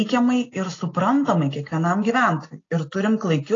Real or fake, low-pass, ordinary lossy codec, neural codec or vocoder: real; 7.2 kHz; AAC, 32 kbps; none